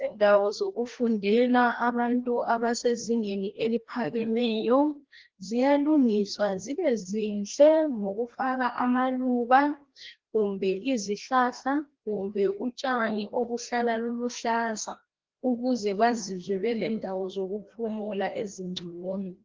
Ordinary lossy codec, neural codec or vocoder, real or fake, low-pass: Opus, 16 kbps; codec, 16 kHz, 1 kbps, FreqCodec, larger model; fake; 7.2 kHz